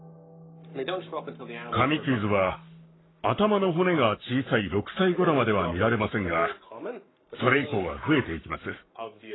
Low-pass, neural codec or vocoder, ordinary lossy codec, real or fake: 7.2 kHz; codec, 44.1 kHz, 7.8 kbps, Pupu-Codec; AAC, 16 kbps; fake